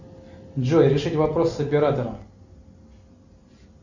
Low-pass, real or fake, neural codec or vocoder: 7.2 kHz; real; none